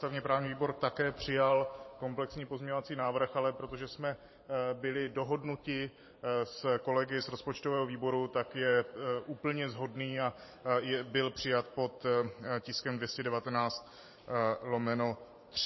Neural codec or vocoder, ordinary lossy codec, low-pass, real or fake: vocoder, 44.1 kHz, 128 mel bands every 512 samples, BigVGAN v2; MP3, 24 kbps; 7.2 kHz; fake